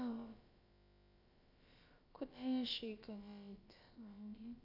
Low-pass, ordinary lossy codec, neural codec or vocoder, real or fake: 5.4 kHz; AAC, 48 kbps; codec, 16 kHz, about 1 kbps, DyCAST, with the encoder's durations; fake